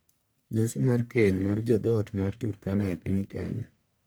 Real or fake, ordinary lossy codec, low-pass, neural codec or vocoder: fake; none; none; codec, 44.1 kHz, 1.7 kbps, Pupu-Codec